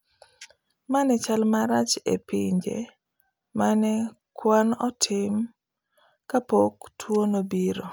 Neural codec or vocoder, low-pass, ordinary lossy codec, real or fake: none; none; none; real